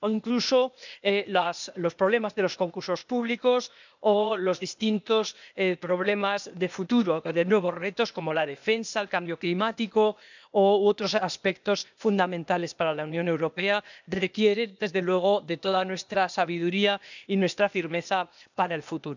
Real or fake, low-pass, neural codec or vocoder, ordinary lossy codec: fake; 7.2 kHz; codec, 16 kHz, 0.8 kbps, ZipCodec; none